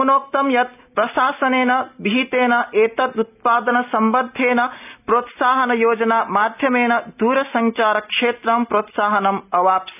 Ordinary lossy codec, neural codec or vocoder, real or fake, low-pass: none; none; real; 3.6 kHz